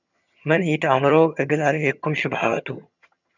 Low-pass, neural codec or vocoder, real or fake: 7.2 kHz; vocoder, 22.05 kHz, 80 mel bands, HiFi-GAN; fake